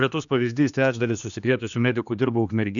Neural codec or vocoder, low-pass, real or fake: codec, 16 kHz, 2 kbps, X-Codec, HuBERT features, trained on general audio; 7.2 kHz; fake